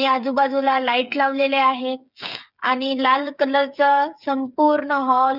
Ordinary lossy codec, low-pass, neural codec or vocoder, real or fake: none; 5.4 kHz; codec, 16 kHz, 8 kbps, FreqCodec, smaller model; fake